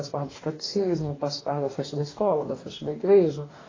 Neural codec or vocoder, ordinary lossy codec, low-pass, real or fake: codec, 44.1 kHz, 2.6 kbps, DAC; AAC, 32 kbps; 7.2 kHz; fake